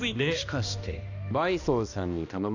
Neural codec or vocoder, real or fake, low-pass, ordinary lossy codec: codec, 16 kHz, 1 kbps, X-Codec, HuBERT features, trained on balanced general audio; fake; 7.2 kHz; none